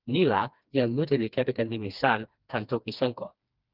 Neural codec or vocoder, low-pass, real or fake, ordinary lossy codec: codec, 16 kHz, 2 kbps, FreqCodec, smaller model; 5.4 kHz; fake; Opus, 24 kbps